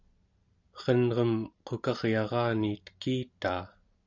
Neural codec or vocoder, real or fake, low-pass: vocoder, 44.1 kHz, 128 mel bands every 256 samples, BigVGAN v2; fake; 7.2 kHz